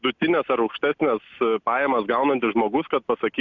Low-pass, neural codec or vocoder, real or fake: 7.2 kHz; none; real